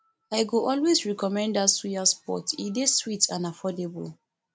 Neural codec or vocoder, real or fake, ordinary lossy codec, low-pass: none; real; none; none